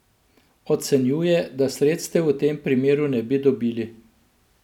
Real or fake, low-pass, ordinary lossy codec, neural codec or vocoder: real; 19.8 kHz; none; none